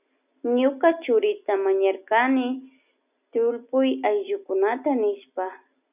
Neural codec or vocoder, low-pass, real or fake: none; 3.6 kHz; real